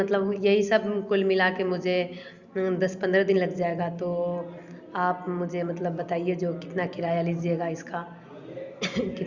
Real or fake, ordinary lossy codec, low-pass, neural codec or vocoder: real; Opus, 64 kbps; 7.2 kHz; none